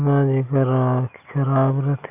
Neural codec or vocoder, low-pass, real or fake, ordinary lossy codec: none; 3.6 kHz; real; none